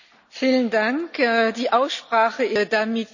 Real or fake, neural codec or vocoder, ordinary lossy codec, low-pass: real; none; none; 7.2 kHz